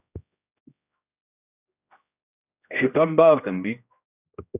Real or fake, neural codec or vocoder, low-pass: fake; codec, 16 kHz, 1 kbps, X-Codec, HuBERT features, trained on general audio; 3.6 kHz